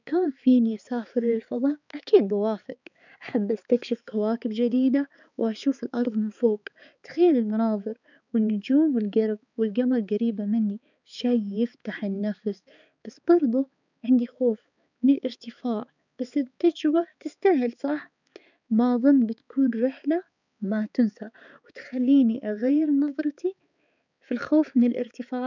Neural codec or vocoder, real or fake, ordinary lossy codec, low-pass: codec, 16 kHz, 4 kbps, X-Codec, HuBERT features, trained on balanced general audio; fake; none; 7.2 kHz